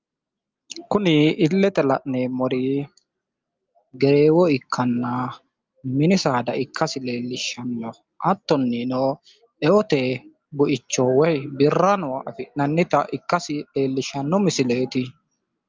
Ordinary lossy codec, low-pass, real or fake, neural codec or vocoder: Opus, 24 kbps; 7.2 kHz; real; none